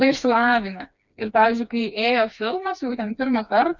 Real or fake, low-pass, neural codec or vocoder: fake; 7.2 kHz; codec, 16 kHz, 2 kbps, FreqCodec, smaller model